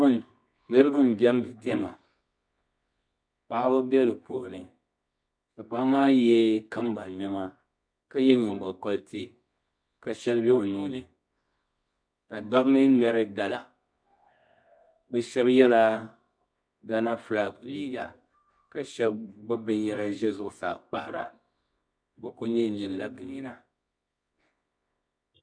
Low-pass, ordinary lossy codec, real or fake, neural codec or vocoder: 9.9 kHz; MP3, 64 kbps; fake; codec, 24 kHz, 0.9 kbps, WavTokenizer, medium music audio release